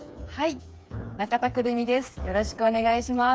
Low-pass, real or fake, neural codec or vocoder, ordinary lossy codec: none; fake; codec, 16 kHz, 4 kbps, FreqCodec, smaller model; none